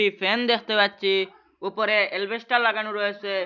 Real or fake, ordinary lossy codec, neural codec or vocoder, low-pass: real; none; none; 7.2 kHz